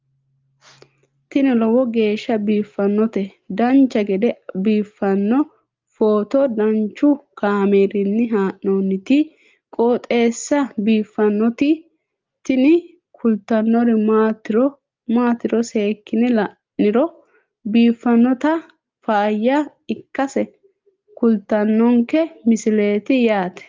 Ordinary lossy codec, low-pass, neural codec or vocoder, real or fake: Opus, 32 kbps; 7.2 kHz; none; real